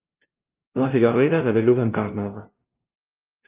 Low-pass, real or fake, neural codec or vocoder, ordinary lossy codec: 3.6 kHz; fake; codec, 16 kHz, 0.5 kbps, FunCodec, trained on LibriTTS, 25 frames a second; Opus, 32 kbps